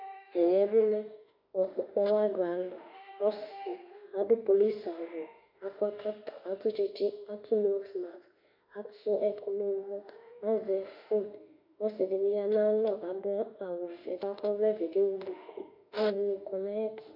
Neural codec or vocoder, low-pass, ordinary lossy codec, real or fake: autoencoder, 48 kHz, 32 numbers a frame, DAC-VAE, trained on Japanese speech; 5.4 kHz; MP3, 48 kbps; fake